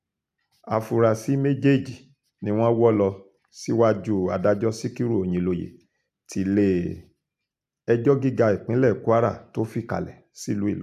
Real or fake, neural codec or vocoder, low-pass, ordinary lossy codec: real; none; 14.4 kHz; none